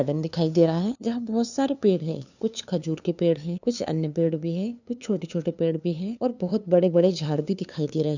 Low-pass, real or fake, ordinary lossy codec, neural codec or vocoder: 7.2 kHz; fake; none; codec, 16 kHz, 2 kbps, FunCodec, trained on LibriTTS, 25 frames a second